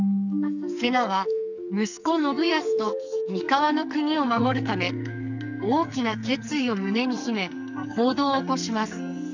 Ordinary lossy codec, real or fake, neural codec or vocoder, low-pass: none; fake; codec, 44.1 kHz, 2.6 kbps, SNAC; 7.2 kHz